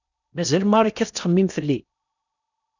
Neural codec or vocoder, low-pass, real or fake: codec, 16 kHz in and 24 kHz out, 0.8 kbps, FocalCodec, streaming, 65536 codes; 7.2 kHz; fake